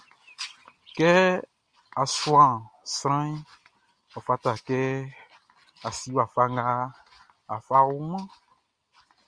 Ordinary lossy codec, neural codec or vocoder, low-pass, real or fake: Opus, 64 kbps; none; 9.9 kHz; real